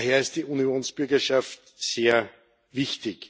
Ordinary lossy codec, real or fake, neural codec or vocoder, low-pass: none; real; none; none